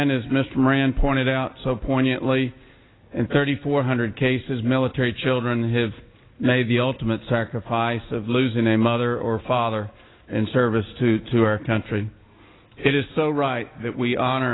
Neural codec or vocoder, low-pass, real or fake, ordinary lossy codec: none; 7.2 kHz; real; AAC, 16 kbps